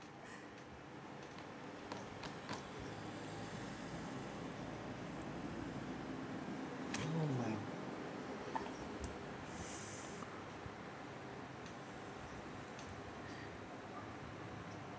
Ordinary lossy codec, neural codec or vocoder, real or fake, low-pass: none; none; real; none